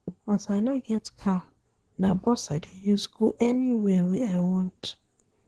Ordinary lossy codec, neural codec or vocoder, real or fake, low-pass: Opus, 16 kbps; codec, 24 kHz, 1 kbps, SNAC; fake; 10.8 kHz